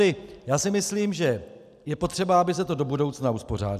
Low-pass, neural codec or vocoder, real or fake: 14.4 kHz; none; real